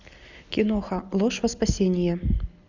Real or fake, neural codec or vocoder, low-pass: real; none; 7.2 kHz